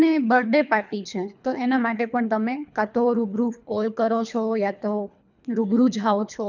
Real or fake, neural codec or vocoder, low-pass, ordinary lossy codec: fake; codec, 24 kHz, 3 kbps, HILCodec; 7.2 kHz; none